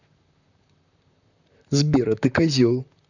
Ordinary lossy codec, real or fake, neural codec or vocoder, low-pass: none; fake; vocoder, 44.1 kHz, 128 mel bands, Pupu-Vocoder; 7.2 kHz